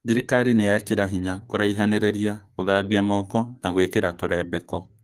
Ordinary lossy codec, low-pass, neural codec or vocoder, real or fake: Opus, 32 kbps; 14.4 kHz; codec, 32 kHz, 1.9 kbps, SNAC; fake